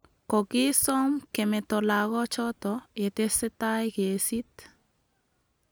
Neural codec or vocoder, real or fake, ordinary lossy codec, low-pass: none; real; none; none